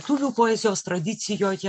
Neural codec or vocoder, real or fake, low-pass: none; real; 10.8 kHz